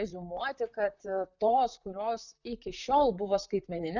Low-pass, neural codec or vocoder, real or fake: 7.2 kHz; vocoder, 44.1 kHz, 128 mel bands, Pupu-Vocoder; fake